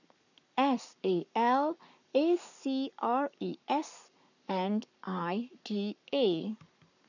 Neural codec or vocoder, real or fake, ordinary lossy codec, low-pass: codec, 44.1 kHz, 7.8 kbps, Pupu-Codec; fake; none; 7.2 kHz